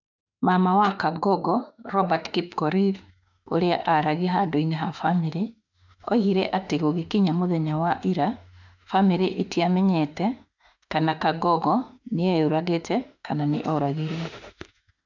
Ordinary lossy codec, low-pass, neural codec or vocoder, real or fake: none; 7.2 kHz; autoencoder, 48 kHz, 32 numbers a frame, DAC-VAE, trained on Japanese speech; fake